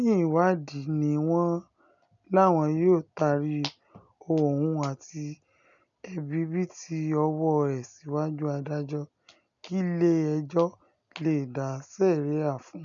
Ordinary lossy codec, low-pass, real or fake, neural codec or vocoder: none; 7.2 kHz; real; none